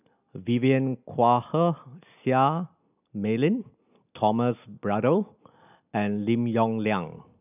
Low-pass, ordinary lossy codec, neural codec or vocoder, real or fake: 3.6 kHz; none; none; real